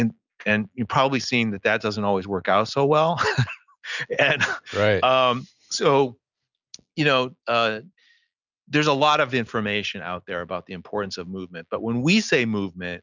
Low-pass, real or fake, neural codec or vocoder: 7.2 kHz; real; none